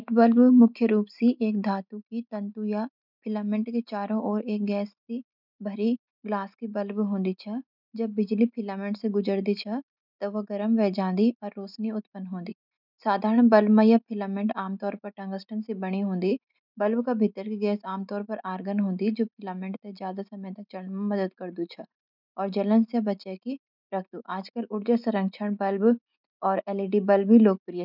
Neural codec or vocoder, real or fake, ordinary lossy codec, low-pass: none; real; none; 5.4 kHz